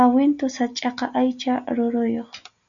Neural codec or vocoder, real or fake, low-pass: none; real; 7.2 kHz